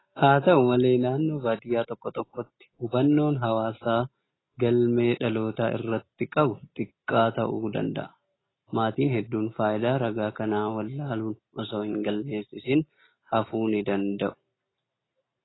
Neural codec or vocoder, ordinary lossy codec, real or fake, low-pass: none; AAC, 16 kbps; real; 7.2 kHz